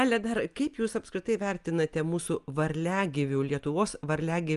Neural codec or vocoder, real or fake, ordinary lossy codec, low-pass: none; real; Opus, 32 kbps; 10.8 kHz